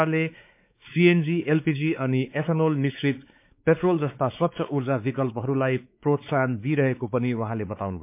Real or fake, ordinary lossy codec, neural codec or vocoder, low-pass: fake; MP3, 32 kbps; codec, 16 kHz, 4 kbps, X-Codec, WavLM features, trained on Multilingual LibriSpeech; 3.6 kHz